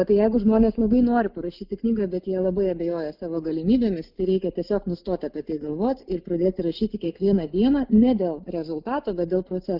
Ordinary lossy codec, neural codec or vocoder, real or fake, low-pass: Opus, 24 kbps; none; real; 5.4 kHz